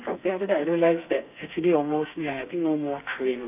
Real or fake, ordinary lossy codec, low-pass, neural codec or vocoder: fake; none; 3.6 kHz; codec, 32 kHz, 1.9 kbps, SNAC